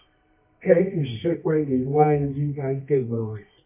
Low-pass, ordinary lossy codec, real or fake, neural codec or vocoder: 3.6 kHz; MP3, 24 kbps; fake; codec, 24 kHz, 0.9 kbps, WavTokenizer, medium music audio release